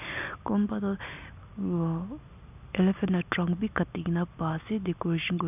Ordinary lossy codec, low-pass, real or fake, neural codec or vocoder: none; 3.6 kHz; real; none